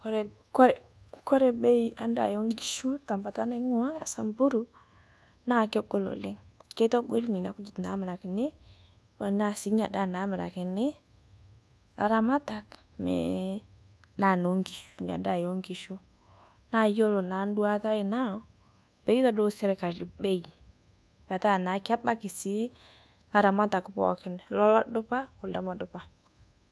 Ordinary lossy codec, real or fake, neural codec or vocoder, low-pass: none; fake; codec, 24 kHz, 1.2 kbps, DualCodec; none